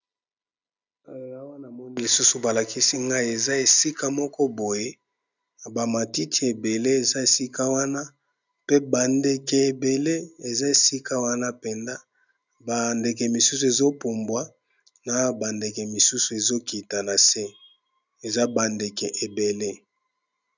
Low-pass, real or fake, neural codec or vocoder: 7.2 kHz; real; none